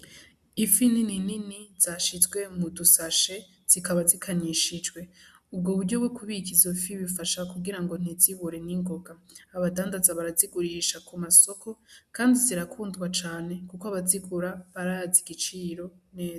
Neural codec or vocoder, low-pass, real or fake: none; 14.4 kHz; real